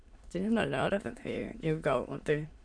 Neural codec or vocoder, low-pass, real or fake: autoencoder, 22.05 kHz, a latent of 192 numbers a frame, VITS, trained on many speakers; 9.9 kHz; fake